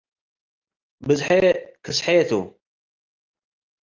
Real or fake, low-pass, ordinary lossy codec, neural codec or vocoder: real; 7.2 kHz; Opus, 32 kbps; none